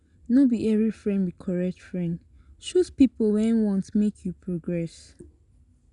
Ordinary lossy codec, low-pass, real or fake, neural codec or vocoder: none; 10.8 kHz; real; none